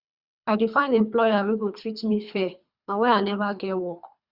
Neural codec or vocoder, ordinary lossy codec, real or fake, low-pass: codec, 24 kHz, 3 kbps, HILCodec; Opus, 64 kbps; fake; 5.4 kHz